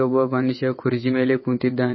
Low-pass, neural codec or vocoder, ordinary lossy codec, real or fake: 7.2 kHz; vocoder, 22.05 kHz, 80 mel bands, WaveNeXt; MP3, 24 kbps; fake